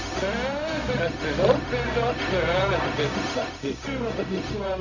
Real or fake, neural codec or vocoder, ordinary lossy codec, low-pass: fake; codec, 16 kHz, 0.4 kbps, LongCat-Audio-Codec; none; 7.2 kHz